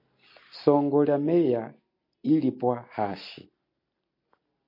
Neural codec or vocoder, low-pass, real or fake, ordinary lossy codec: none; 5.4 kHz; real; MP3, 32 kbps